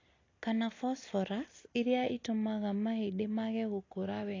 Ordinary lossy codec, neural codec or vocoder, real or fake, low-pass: AAC, 32 kbps; none; real; 7.2 kHz